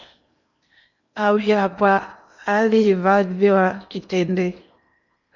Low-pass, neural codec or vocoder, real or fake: 7.2 kHz; codec, 16 kHz in and 24 kHz out, 0.8 kbps, FocalCodec, streaming, 65536 codes; fake